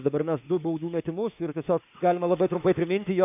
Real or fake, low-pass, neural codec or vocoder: fake; 3.6 kHz; autoencoder, 48 kHz, 32 numbers a frame, DAC-VAE, trained on Japanese speech